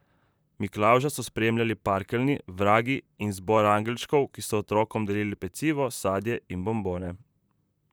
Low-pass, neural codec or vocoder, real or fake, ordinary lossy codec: none; vocoder, 44.1 kHz, 128 mel bands, Pupu-Vocoder; fake; none